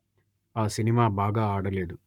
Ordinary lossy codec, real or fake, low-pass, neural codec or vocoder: none; fake; 19.8 kHz; codec, 44.1 kHz, 7.8 kbps, Pupu-Codec